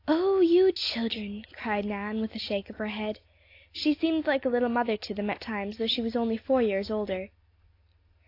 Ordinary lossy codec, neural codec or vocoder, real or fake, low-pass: AAC, 32 kbps; none; real; 5.4 kHz